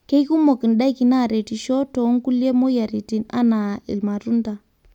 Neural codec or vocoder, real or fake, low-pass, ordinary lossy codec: none; real; 19.8 kHz; none